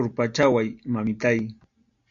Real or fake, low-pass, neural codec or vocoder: real; 7.2 kHz; none